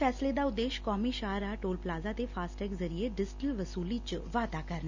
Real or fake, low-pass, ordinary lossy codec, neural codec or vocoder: real; 7.2 kHz; Opus, 64 kbps; none